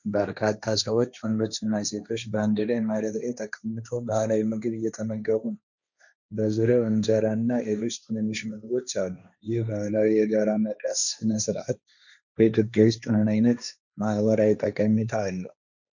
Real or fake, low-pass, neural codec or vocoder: fake; 7.2 kHz; codec, 16 kHz, 1.1 kbps, Voila-Tokenizer